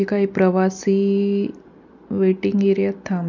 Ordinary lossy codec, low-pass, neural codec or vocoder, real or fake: none; 7.2 kHz; none; real